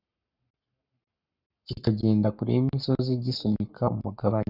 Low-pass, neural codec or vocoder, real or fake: 5.4 kHz; codec, 44.1 kHz, 7.8 kbps, Pupu-Codec; fake